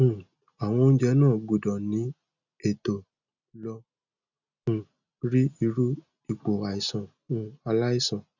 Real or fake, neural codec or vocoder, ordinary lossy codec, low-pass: real; none; none; 7.2 kHz